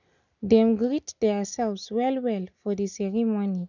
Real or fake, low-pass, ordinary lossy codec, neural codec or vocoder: real; 7.2 kHz; none; none